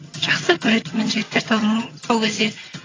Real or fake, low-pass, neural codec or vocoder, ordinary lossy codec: fake; 7.2 kHz; vocoder, 22.05 kHz, 80 mel bands, HiFi-GAN; AAC, 32 kbps